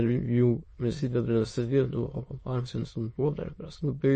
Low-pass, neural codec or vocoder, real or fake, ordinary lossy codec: 9.9 kHz; autoencoder, 22.05 kHz, a latent of 192 numbers a frame, VITS, trained on many speakers; fake; MP3, 32 kbps